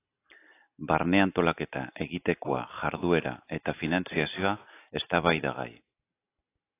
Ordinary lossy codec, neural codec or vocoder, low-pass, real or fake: AAC, 24 kbps; none; 3.6 kHz; real